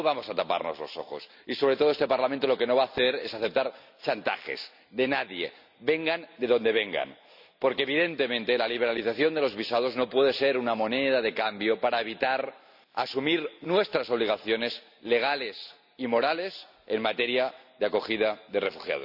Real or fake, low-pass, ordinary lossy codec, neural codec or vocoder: real; 5.4 kHz; none; none